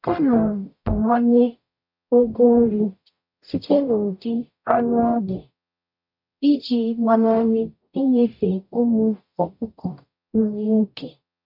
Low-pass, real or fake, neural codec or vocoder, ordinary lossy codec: 5.4 kHz; fake; codec, 44.1 kHz, 0.9 kbps, DAC; MP3, 48 kbps